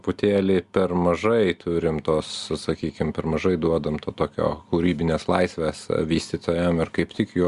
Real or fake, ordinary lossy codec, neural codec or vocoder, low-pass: real; Opus, 64 kbps; none; 10.8 kHz